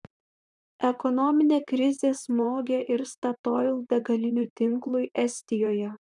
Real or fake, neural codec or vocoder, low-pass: fake; vocoder, 44.1 kHz, 128 mel bands, Pupu-Vocoder; 10.8 kHz